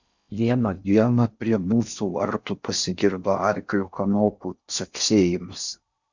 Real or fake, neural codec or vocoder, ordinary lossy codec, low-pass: fake; codec, 16 kHz in and 24 kHz out, 0.6 kbps, FocalCodec, streaming, 4096 codes; Opus, 64 kbps; 7.2 kHz